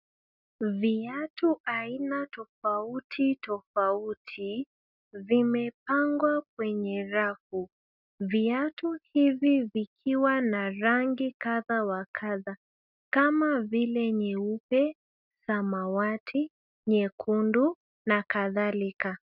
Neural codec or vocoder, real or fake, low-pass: none; real; 5.4 kHz